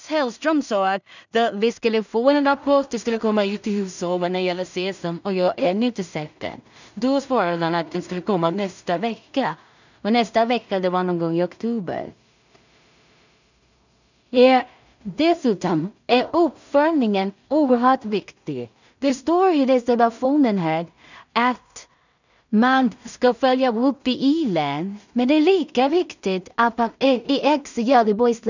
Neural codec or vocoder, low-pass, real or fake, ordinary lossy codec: codec, 16 kHz in and 24 kHz out, 0.4 kbps, LongCat-Audio-Codec, two codebook decoder; 7.2 kHz; fake; none